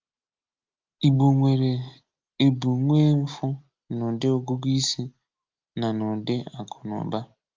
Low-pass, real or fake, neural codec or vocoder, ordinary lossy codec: 7.2 kHz; real; none; Opus, 32 kbps